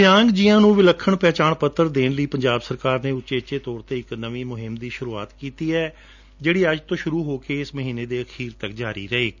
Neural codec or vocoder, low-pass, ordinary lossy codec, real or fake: none; 7.2 kHz; none; real